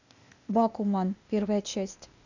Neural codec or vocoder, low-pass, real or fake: codec, 16 kHz, 0.8 kbps, ZipCodec; 7.2 kHz; fake